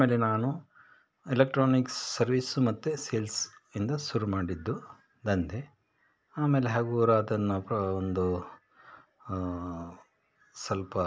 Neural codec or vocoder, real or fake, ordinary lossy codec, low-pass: none; real; none; none